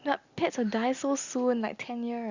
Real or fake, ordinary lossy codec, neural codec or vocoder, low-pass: real; Opus, 64 kbps; none; 7.2 kHz